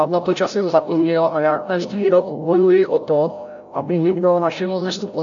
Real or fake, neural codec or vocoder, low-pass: fake; codec, 16 kHz, 0.5 kbps, FreqCodec, larger model; 7.2 kHz